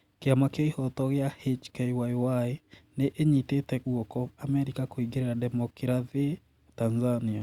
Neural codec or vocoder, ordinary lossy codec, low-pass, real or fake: none; Opus, 64 kbps; 19.8 kHz; real